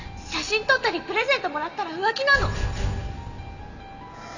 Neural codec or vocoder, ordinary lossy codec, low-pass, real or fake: none; none; 7.2 kHz; real